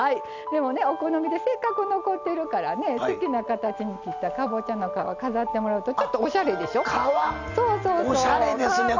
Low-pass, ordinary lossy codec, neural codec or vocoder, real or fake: 7.2 kHz; none; none; real